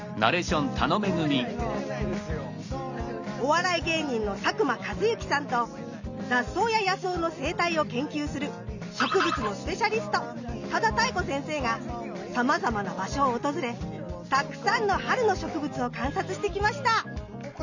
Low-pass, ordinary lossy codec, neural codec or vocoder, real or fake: 7.2 kHz; none; none; real